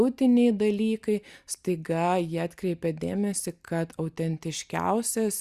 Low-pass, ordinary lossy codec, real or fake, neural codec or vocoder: 14.4 kHz; Opus, 64 kbps; real; none